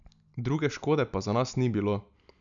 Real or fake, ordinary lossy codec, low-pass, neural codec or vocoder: real; none; 7.2 kHz; none